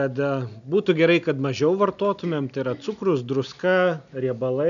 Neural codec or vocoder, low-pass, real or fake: none; 7.2 kHz; real